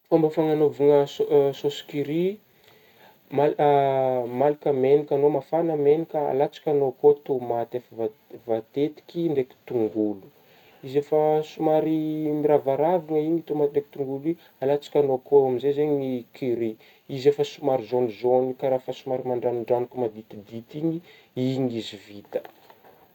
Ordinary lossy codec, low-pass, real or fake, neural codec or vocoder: none; 19.8 kHz; real; none